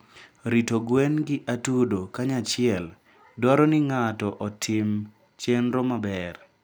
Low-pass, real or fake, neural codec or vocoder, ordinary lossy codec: none; real; none; none